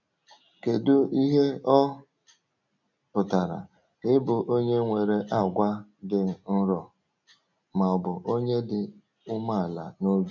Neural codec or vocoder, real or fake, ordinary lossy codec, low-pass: none; real; none; 7.2 kHz